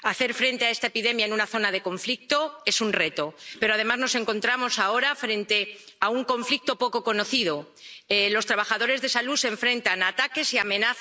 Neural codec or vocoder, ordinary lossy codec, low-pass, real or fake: none; none; none; real